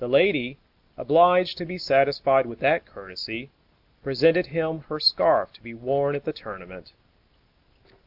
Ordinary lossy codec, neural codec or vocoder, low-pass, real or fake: AAC, 48 kbps; none; 5.4 kHz; real